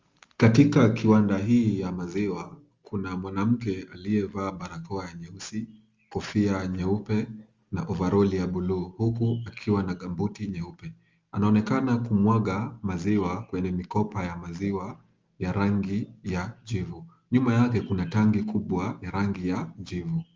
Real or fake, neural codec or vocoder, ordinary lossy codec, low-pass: real; none; Opus, 32 kbps; 7.2 kHz